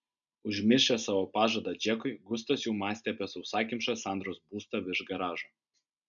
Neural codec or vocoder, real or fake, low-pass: none; real; 7.2 kHz